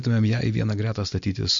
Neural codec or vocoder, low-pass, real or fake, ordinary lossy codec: none; 7.2 kHz; real; MP3, 48 kbps